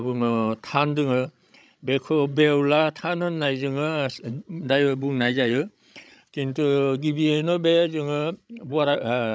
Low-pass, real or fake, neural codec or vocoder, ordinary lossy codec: none; fake; codec, 16 kHz, 16 kbps, FreqCodec, larger model; none